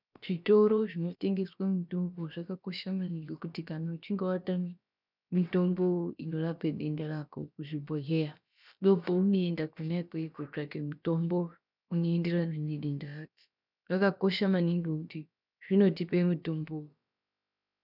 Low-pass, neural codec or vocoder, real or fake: 5.4 kHz; codec, 16 kHz, about 1 kbps, DyCAST, with the encoder's durations; fake